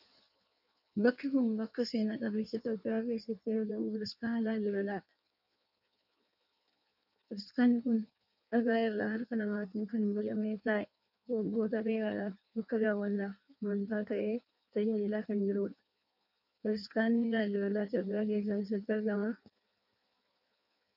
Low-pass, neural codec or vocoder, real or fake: 5.4 kHz; codec, 16 kHz in and 24 kHz out, 1.1 kbps, FireRedTTS-2 codec; fake